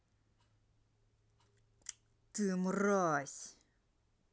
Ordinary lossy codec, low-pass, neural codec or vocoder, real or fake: none; none; none; real